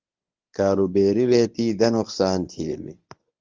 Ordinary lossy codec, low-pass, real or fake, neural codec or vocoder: Opus, 32 kbps; 7.2 kHz; fake; codec, 24 kHz, 0.9 kbps, WavTokenizer, medium speech release version 1